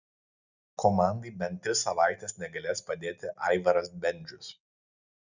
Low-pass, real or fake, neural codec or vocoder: 7.2 kHz; real; none